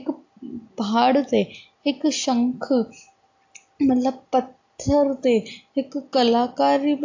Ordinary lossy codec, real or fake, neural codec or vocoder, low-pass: AAC, 48 kbps; real; none; 7.2 kHz